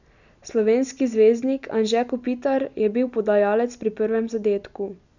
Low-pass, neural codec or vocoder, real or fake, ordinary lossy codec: 7.2 kHz; none; real; none